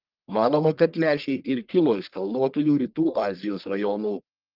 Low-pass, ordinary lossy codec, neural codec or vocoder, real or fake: 5.4 kHz; Opus, 32 kbps; codec, 44.1 kHz, 1.7 kbps, Pupu-Codec; fake